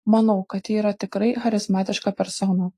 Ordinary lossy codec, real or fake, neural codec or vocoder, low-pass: AAC, 48 kbps; real; none; 14.4 kHz